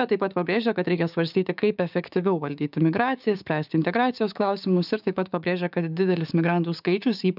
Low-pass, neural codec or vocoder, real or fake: 5.4 kHz; codec, 16 kHz, 6 kbps, DAC; fake